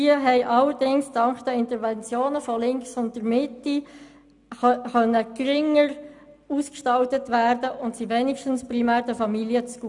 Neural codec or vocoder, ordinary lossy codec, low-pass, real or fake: none; none; 10.8 kHz; real